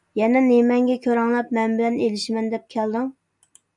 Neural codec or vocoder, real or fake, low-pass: none; real; 10.8 kHz